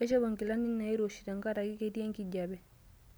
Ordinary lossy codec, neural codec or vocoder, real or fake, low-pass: none; none; real; none